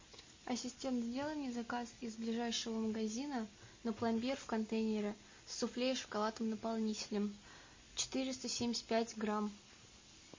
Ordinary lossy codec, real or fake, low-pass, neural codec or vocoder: MP3, 32 kbps; real; 7.2 kHz; none